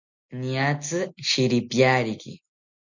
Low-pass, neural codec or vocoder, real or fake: 7.2 kHz; none; real